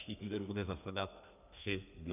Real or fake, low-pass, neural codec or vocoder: fake; 3.6 kHz; codec, 32 kHz, 1.9 kbps, SNAC